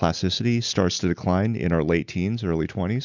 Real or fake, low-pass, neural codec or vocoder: real; 7.2 kHz; none